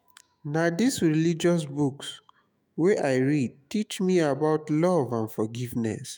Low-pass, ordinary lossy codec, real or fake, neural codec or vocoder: none; none; fake; autoencoder, 48 kHz, 128 numbers a frame, DAC-VAE, trained on Japanese speech